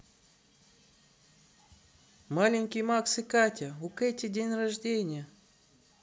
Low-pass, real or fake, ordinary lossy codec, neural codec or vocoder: none; real; none; none